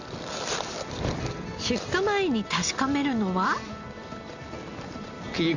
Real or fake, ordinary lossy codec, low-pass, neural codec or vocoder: real; Opus, 64 kbps; 7.2 kHz; none